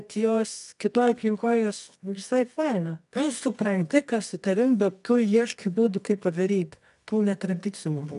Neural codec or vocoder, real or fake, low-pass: codec, 24 kHz, 0.9 kbps, WavTokenizer, medium music audio release; fake; 10.8 kHz